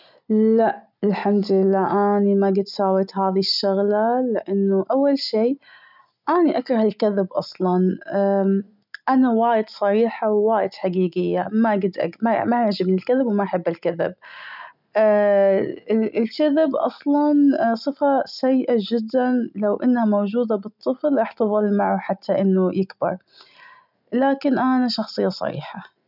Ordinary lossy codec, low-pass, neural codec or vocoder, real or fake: none; 5.4 kHz; none; real